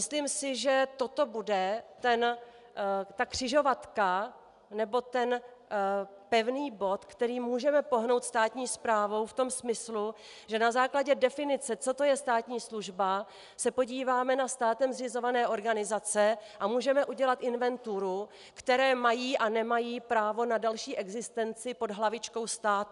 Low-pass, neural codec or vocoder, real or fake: 10.8 kHz; none; real